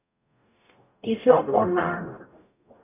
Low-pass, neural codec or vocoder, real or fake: 3.6 kHz; codec, 44.1 kHz, 0.9 kbps, DAC; fake